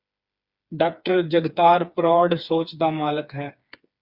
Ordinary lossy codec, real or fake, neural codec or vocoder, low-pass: Opus, 64 kbps; fake; codec, 16 kHz, 4 kbps, FreqCodec, smaller model; 5.4 kHz